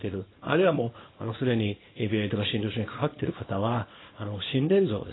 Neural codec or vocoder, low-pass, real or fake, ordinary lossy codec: codec, 24 kHz, 0.9 kbps, WavTokenizer, small release; 7.2 kHz; fake; AAC, 16 kbps